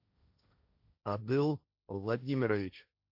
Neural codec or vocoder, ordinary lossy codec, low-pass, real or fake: codec, 16 kHz, 1.1 kbps, Voila-Tokenizer; AAC, 48 kbps; 5.4 kHz; fake